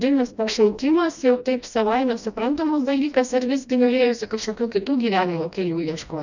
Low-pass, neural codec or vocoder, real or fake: 7.2 kHz; codec, 16 kHz, 1 kbps, FreqCodec, smaller model; fake